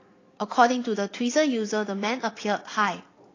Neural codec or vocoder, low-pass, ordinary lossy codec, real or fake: vocoder, 44.1 kHz, 80 mel bands, Vocos; 7.2 kHz; AAC, 32 kbps; fake